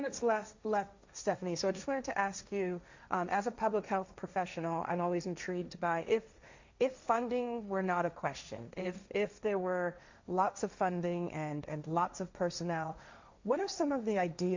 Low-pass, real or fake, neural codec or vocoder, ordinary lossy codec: 7.2 kHz; fake; codec, 16 kHz, 1.1 kbps, Voila-Tokenizer; Opus, 64 kbps